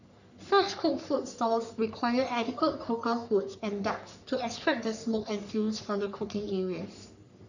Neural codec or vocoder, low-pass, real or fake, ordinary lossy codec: codec, 44.1 kHz, 3.4 kbps, Pupu-Codec; 7.2 kHz; fake; none